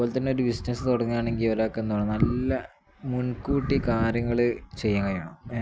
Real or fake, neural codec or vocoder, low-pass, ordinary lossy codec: real; none; none; none